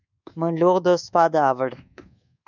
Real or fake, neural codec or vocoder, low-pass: fake; codec, 24 kHz, 1.2 kbps, DualCodec; 7.2 kHz